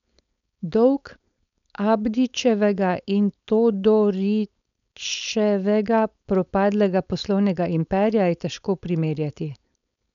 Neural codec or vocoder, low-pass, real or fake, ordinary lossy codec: codec, 16 kHz, 4.8 kbps, FACodec; 7.2 kHz; fake; none